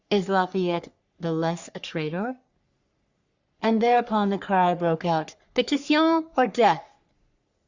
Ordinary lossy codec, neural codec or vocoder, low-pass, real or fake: Opus, 64 kbps; codec, 44.1 kHz, 3.4 kbps, Pupu-Codec; 7.2 kHz; fake